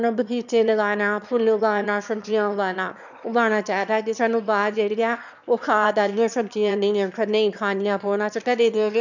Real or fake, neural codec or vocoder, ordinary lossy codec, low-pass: fake; autoencoder, 22.05 kHz, a latent of 192 numbers a frame, VITS, trained on one speaker; none; 7.2 kHz